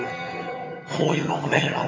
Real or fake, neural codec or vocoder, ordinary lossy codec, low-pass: fake; vocoder, 22.05 kHz, 80 mel bands, HiFi-GAN; AAC, 32 kbps; 7.2 kHz